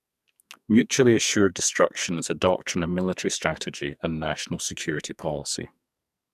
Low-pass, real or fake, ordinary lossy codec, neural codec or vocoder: 14.4 kHz; fake; none; codec, 44.1 kHz, 2.6 kbps, SNAC